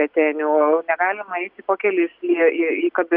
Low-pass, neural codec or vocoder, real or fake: 5.4 kHz; none; real